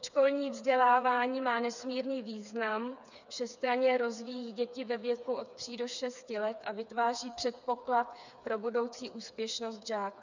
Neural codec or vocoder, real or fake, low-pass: codec, 16 kHz, 4 kbps, FreqCodec, smaller model; fake; 7.2 kHz